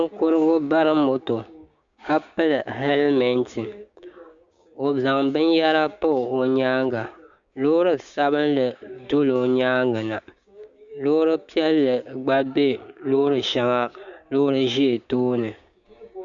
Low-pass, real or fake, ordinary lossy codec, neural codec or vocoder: 7.2 kHz; fake; Opus, 64 kbps; codec, 16 kHz, 6 kbps, DAC